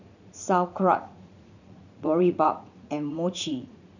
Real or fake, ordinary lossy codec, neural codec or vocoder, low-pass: fake; none; vocoder, 44.1 kHz, 80 mel bands, Vocos; 7.2 kHz